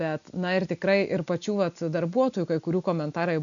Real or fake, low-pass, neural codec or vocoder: real; 7.2 kHz; none